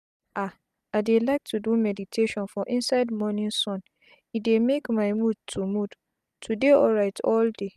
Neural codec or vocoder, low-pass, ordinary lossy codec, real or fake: none; 14.4 kHz; none; real